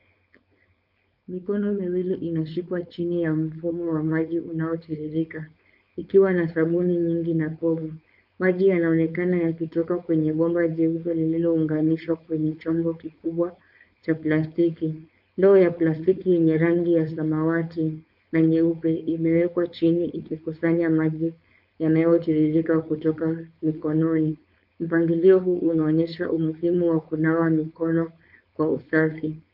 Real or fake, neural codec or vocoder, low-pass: fake; codec, 16 kHz, 4.8 kbps, FACodec; 5.4 kHz